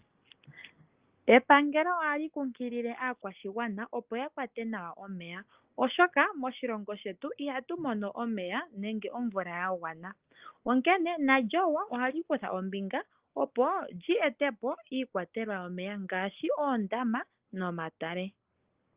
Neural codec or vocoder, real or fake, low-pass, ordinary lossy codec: none; real; 3.6 kHz; Opus, 32 kbps